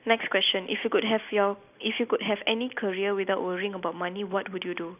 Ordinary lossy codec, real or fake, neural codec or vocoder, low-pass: none; real; none; 3.6 kHz